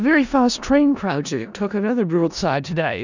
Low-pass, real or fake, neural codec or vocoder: 7.2 kHz; fake; codec, 16 kHz in and 24 kHz out, 0.4 kbps, LongCat-Audio-Codec, four codebook decoder